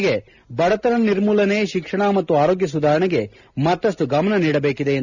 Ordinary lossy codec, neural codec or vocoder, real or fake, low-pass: none; none; real; 7.2 kHz